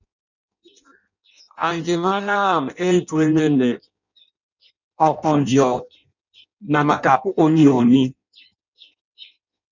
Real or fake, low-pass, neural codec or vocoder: fake; 7.2 kHz; codec, 16 kHz in and 24 kHz out, 0.6 kbps, FireRedTTS-2 codec